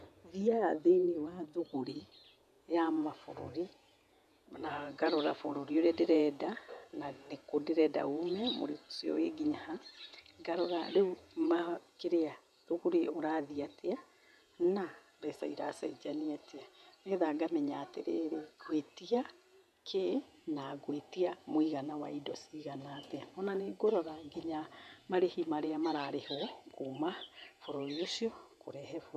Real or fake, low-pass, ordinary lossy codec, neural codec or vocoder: fake; 14.4 kHz; none; vocoder, 48 kHz, 128 mel bands, Vocos